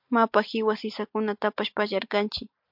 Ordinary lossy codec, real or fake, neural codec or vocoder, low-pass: MP3, 48 kbps; real; none; 5.4 kHz